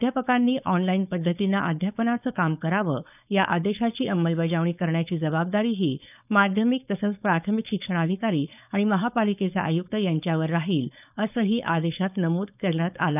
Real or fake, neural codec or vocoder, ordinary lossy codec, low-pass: fake; codec, 16 kHz, 4.8 kbps, FACodec; none; 3.6 kHz